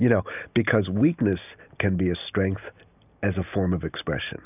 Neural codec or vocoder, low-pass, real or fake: none; 3.6 kHz; real